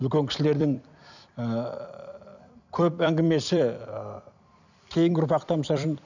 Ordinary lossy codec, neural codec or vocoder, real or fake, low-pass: none; vocoder, 44.1 kHz, 128 mel bands every 256 samples, BigVGAN v2; fake; 7.2 kHz